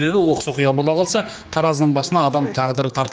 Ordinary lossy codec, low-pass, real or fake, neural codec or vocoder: none; none; fake; codec, 16 kHz, 2 kbps, X-Codec, HuBERT features, trained on general audio